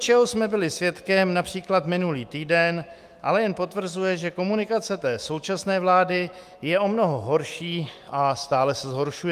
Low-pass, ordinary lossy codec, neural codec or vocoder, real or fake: 14.4 kHz; Opus, 32 kbps; autoencoder, 48 kHz, 128 numbers a frame, DAC-VAE, trained on Japanese speech; fake